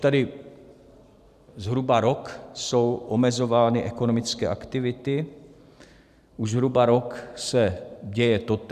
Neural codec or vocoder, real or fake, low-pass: vocoder, 44.1 kHz, 128 mel bands every 512 samples, BigVGAN v2; fake; 14.4 kHz